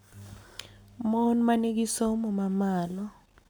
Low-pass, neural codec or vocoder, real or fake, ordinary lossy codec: none; none; real; none